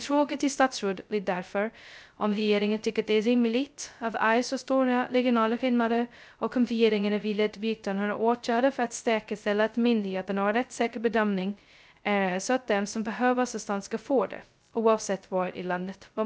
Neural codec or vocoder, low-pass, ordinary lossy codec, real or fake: codec, 16 kHz, 0.2 kbps, FocalCodec; none; none; fake